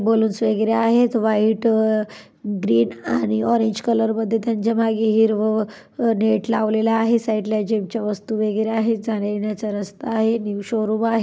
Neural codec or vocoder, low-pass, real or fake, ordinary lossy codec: none; none; real; none